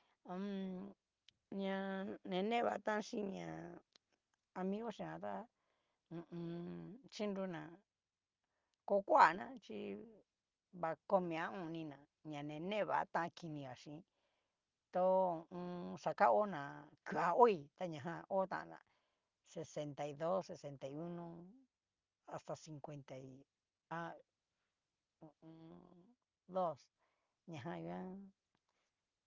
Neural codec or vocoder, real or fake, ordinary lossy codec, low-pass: none; real; Opus, 32 kbps; 7.2 kHz